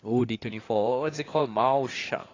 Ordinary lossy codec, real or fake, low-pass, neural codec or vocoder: AAC, 32 kbps; fake; 7.2 kHz; codec, 16 kHz in and 24 kHz out, 2.2 kbps, FireRedTTS-2 codec